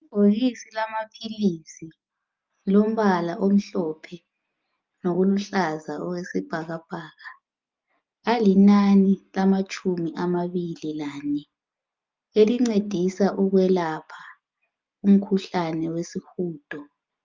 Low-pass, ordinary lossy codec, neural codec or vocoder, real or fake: 7.2 kHz; Opus, 24 kbps; none; real